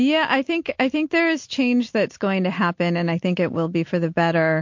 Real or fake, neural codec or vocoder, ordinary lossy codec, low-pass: real; none; MP3, 48 kbps; 7.2 kHz